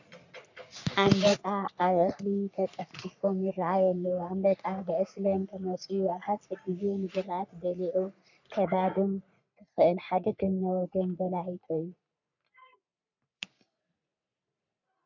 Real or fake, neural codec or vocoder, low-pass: fake; codec, 44.1 kHz, 3.4 kbps, Pupu-Codec; 7.2 kHz